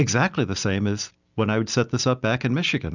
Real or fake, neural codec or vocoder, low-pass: real; none; 7.2 kHz